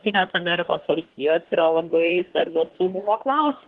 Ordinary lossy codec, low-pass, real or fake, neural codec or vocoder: Opus, 16 kbps; 10.8 kHz; fake; codec, 24 kHz, 1 kbps, SNAC